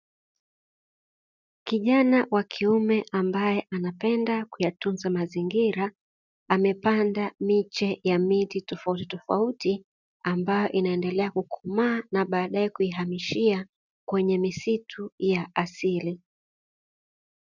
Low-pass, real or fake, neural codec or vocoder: 7.2 kHz; real; none